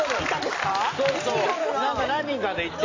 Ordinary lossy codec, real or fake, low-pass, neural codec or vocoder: AAC, 32 kbps; real; 7.2 kHz; none